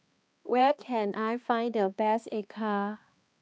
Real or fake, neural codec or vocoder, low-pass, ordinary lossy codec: fake; codec, 16 kHz, 2 kbps, X-Codec, HuBERT features, trained on balanced general audio; none; none